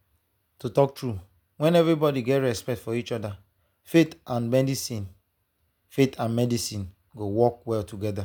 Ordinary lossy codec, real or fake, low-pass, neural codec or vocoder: none; real; none; none